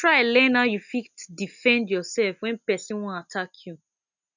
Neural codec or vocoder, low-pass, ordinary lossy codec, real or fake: none; 7.2 kHz; none; real